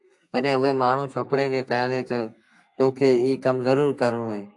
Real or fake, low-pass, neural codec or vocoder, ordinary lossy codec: fake; 10.8 kHz; codec, 32 kHz, 1.9 kbps, SNAC; AAC, 64 kbps